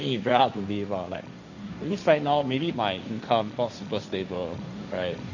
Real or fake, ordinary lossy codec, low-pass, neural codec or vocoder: fake; none; 7.2 kHz; codec, 16 kHz, 1.1 kbps, Voila-Tokenizer